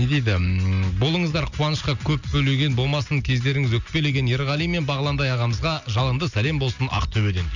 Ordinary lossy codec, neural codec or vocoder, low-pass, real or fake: none; none; 7.2 kHz; real